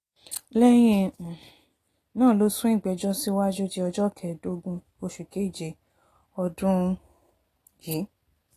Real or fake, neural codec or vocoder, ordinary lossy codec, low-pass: real; none; AAC, 48 kbps; 14.4 kHz